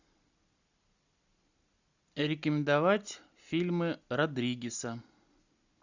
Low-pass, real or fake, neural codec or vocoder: 7.2 kHz; real; none